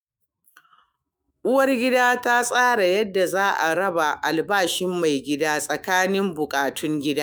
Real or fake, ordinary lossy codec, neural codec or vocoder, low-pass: fake; none; autoencoder, 48 kHz, 128 numbers a frame, DAC-VAE, trained on Japanese speech; none